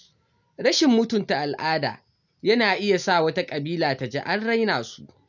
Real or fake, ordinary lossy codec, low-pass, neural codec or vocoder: real; none; 7.2 kHz; none